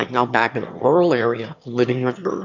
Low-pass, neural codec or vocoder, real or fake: 7.2 kHz; autoencoder, 22.05 kHz, a latent of 192 numbers a frame, VITS, trained on one speaker; fake